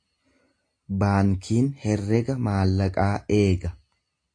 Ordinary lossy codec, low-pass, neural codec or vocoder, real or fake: AAC, 48 kbps; 9.9 kHz; none; real